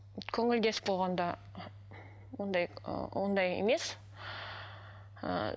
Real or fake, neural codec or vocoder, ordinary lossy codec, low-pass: real; none; none; none